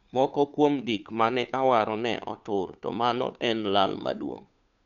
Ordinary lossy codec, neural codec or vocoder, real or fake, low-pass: none; codec, 16 kHz, 2 kbps, FunCodec, trained on Chinese and English, 25 frames a second; fake; 7.2 kHz